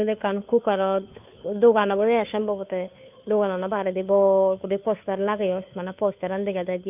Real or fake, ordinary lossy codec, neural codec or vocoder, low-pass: fake; none; codec, 16 kHz, 2 kbps, FunCodec, trained on Chinese and English, 25 frames a second; 3.6 kHz